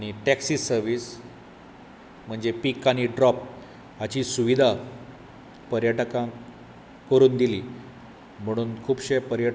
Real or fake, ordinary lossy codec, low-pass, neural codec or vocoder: real; none; none; none